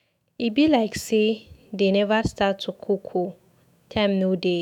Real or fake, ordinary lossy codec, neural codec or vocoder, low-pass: fake; none; autoencoder, 48 kHz, 128 numbers a frame, DAC-VAE, trained on Japanese speech; 19.8 kHz